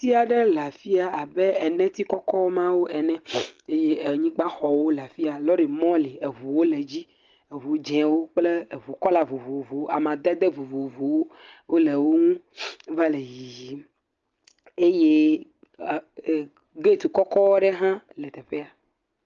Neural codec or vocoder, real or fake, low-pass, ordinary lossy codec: none; real; 7.2 kHz; Opus, 24 kbps